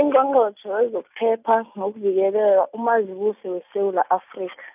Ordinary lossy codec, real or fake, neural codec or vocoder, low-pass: none; real; none; 3.6 kHz